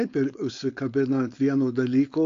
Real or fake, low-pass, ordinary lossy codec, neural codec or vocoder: fake; 7.2 kHz; AAC, 48 kbps; codec, 16 kHz, 4.8 kbps, FACodec